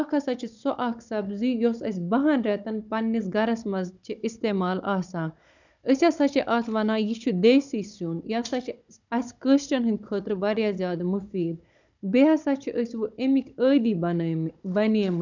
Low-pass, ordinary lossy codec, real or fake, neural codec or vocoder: 7.2 kHz; none; fake; codec, 16 kHz, 16 kbps, FunCodec, trained on Chinese and English, 50 frames a second